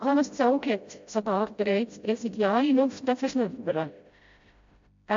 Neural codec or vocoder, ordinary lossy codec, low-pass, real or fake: codec, 16 kHz, 0.5 kbps, FreqCodec, smaller model; MP3, 48 kbps; 7.2 kHz; fake